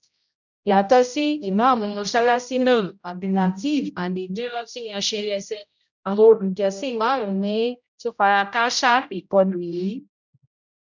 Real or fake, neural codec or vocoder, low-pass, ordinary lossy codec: fake; codec, 16 kHz, 0.5 kbps, X-Codec, HuBERT features, trained on general audio; 7.2 kHz; none